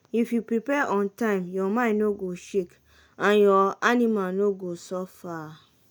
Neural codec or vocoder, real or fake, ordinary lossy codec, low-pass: none; real; none; none